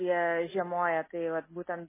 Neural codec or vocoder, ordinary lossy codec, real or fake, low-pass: none; MP3, 16 kbps; real; 3.6 kHz